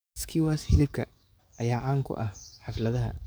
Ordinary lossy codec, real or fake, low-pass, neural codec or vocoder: none; fake; none; codec, 44.1 kHz, 7.8 kbps, DAC